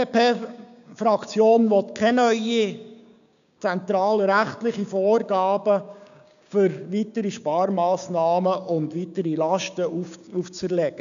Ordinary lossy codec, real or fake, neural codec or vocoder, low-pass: none; fake; codec, 16 kHz, 6 kbps, DAC; 7.2 kHz